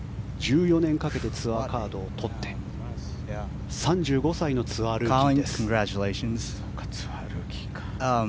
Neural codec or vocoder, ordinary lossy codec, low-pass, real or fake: none; none; none; real